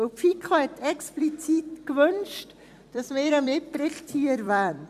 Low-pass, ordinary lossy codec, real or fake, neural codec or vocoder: 14.4 kHz; AAC, 96 kbps; fake; vocoder, 44.1 kHz, 128 mel bands every 256 samples, BigVGAN v2